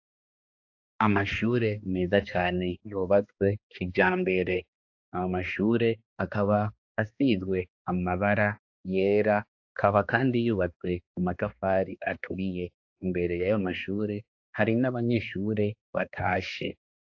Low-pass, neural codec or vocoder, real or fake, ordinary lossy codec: 7.2 kHz; codec, 16 kHz, 2 kbps, X-Codec, HuBERT features, trained on balanced general audio; fake; AAC, 48 kbps